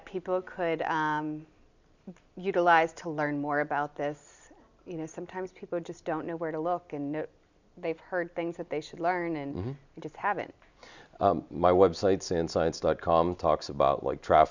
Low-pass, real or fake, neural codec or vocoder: 7.2 kHz; real; none